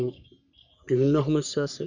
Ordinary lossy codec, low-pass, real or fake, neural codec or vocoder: none; 7.2 kHz; fake; codec, 44.1 kHz, 3.4 kbps, Pupu-Codec